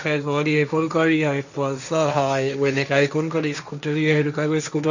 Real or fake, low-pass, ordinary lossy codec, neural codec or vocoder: fake; 7.2 kHz; none; codec, 16 kHz, 1.1 kbps, Voila-Tokenizer